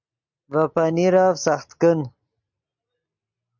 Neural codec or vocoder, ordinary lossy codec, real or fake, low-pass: none; MP3, 64 kbps; real; 7.2 kHz